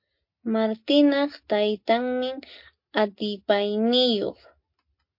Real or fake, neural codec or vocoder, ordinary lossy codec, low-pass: real; none; MP3, 32 kbps; 5.4 kHz